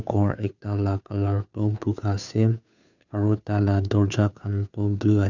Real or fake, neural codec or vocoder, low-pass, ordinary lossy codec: fake; codec, 16 kHz, 2 kbps, FunCodec, trained on Chinese and English, 25 frames a second; 7.2 kHz; none